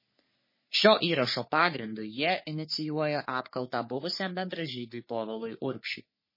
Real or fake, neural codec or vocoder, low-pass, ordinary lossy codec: fake; codec, 44.1 kHz, 3.4 kbps, Pupu-Codec; 5.4 kHz; MP3, 24 kbps